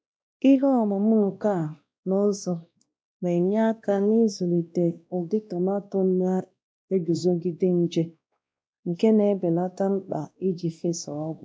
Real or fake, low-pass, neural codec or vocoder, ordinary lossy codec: fake; none; codec, 16 kHz, 1 kbps, X-Codec, WavLM features, trained on Multilingual LibriSpeech; none